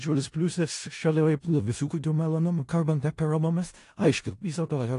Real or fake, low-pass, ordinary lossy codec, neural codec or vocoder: fake; 10.8 kHz; AAC, 48 kbps; codec, 16 kHz in and 24 kHz out, 0.4 kbps, LongCat-Audio-Codec, four codebook decoder